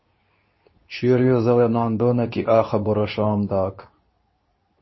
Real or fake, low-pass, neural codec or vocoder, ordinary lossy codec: fake; 7.2 kHz; codec, 24 kHz, 0.9 kbps, WavTokenizer, medium speech release version 2; MP3, 24 kbps